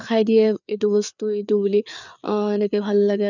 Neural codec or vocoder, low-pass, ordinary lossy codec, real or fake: codec, 16 kHz, 4 kbps, FreqCodec, larger model; 7.2 kHz; none; fake